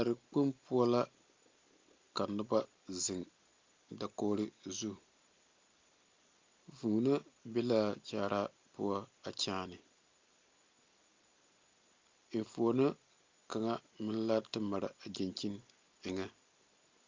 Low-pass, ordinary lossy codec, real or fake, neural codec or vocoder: 7.2 kHz; Opus, 32 kbps; real; none